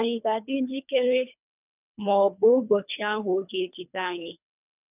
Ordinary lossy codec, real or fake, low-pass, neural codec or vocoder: none; fake; 3.6 kHz; codec, 24 kHz, 3 kbps, HILCodec